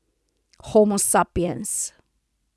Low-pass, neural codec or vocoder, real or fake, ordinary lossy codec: none; none; real; none